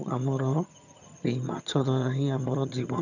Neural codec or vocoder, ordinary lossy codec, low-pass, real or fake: vocoder, 22.05 kHz, 80 mel bands, HiFi-GAN; none; 7.2 kHz; fake